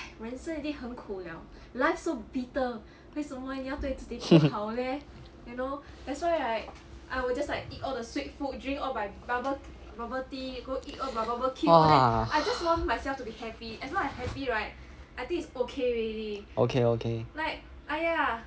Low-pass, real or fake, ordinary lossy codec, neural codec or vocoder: none; real; none; none